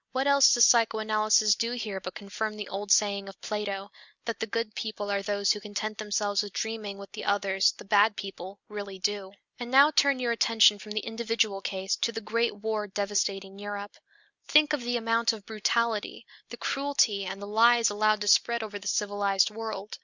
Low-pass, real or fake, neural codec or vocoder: 7.2 kHz; real; none